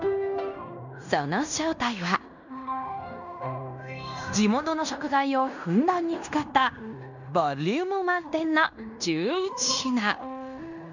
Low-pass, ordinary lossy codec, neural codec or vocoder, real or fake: 7.2 kHz; none; codec, 16 kHz in and 24 kHz out, 0.9 kbps, LongCat-Audio-Codec, fine tuned four codebook decoder; fake